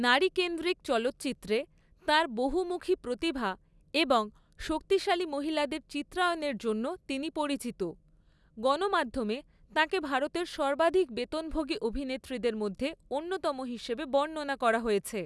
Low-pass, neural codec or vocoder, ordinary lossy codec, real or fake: none; none; none; real